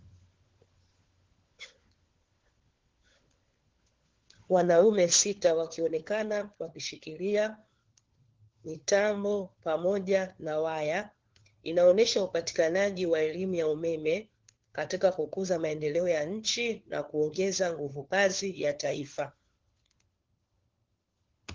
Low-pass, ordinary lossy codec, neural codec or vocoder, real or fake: 7.2 kHz; Opus, 16 kbps; codec, 16 kHz, 4 kbps, FunCodec, trained on LibriTTS, 50 frames a second; fake